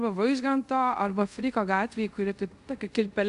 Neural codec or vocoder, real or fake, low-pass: codec, 24 kHz, 0.5 kbps, DualCodec; fake; 10.8 kHz